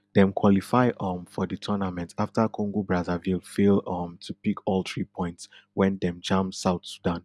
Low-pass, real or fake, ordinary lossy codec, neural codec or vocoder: none; real; none; none